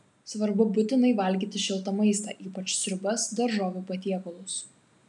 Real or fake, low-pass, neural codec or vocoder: real; 10.8 kHz; none